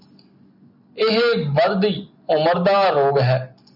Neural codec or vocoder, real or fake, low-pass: none; real; 5.4 kHz